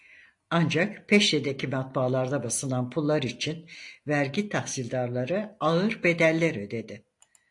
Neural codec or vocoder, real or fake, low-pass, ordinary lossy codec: none; real; 10.8 kHz; AAC, 48 kbps